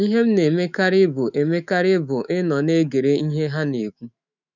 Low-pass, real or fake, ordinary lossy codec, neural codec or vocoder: 7.2 kHz; fake; none; autoencoder, 48 kHz, 128 numbers a frame, DAC-VAE, trained on Japanese speech